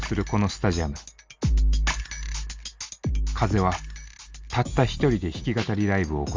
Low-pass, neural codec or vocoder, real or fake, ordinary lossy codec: 7.2 kHz; none; real; Opus, 32 kbps